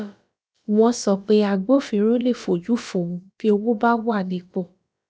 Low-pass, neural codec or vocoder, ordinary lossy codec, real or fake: none; codec, 16 kHz, about 1 kbps, DyCAST, with the encoder's durations; none; fake